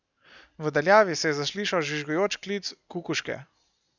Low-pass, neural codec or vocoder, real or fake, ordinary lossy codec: 7.2 kHz; none; real; none